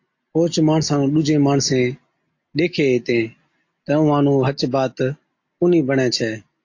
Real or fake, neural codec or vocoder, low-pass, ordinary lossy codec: real; none; 7.2 kHz; AAC, 48 kbps